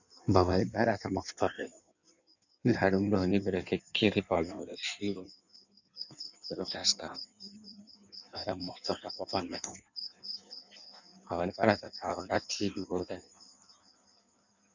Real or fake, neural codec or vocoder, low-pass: fake; codec, 16 kHz in and 24 kHz out, 1.1 kbps, FireRedTTS-2 codec; 7.2 kHz